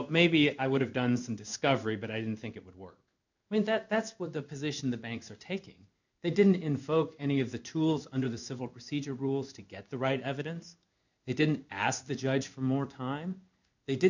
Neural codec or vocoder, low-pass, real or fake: codec, 16 kHz in and 24 kHz out, 1 kbps, XY-Tokenizer; 7.2 kHz; fake